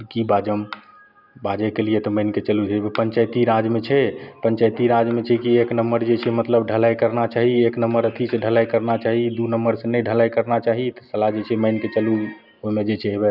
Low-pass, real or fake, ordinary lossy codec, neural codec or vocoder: 5.4 kHz; real; none; none